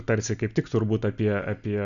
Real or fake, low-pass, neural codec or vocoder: real; 7.2 kHz; none